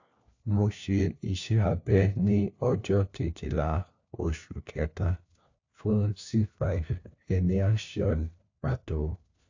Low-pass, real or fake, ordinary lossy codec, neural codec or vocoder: 7.2 kHz; fake; AAC, 48 kbps; codec, 16 kHz, 1 kbps, FunCodec, trained on LibriTTS, 50 frames a second